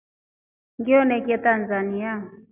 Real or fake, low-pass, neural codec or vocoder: real; 3.6 kHz; none